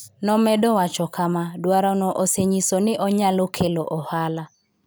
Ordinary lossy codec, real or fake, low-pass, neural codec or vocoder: none; real; none; none